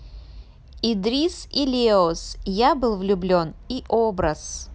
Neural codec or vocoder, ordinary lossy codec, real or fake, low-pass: none; none; real; none